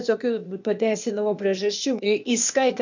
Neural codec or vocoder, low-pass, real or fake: codec, 16 kHz, 0.8 kbps, ZipCodec; 7.2 kHz; fake